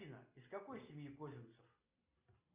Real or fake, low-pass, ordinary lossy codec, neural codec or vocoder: real; 3.6 kHz; Opus, 64 kbps; none